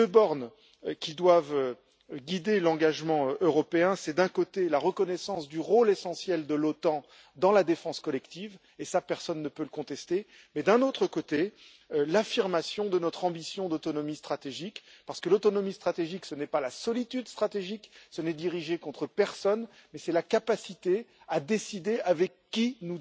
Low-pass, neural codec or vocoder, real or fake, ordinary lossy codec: none; none; real; none